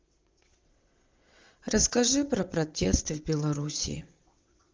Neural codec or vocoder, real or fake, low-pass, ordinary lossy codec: vocoder, 22.05 kHz, 80 mel bands, WaveNeXt; fake; 7.2 kHz; Opus, 32 kbps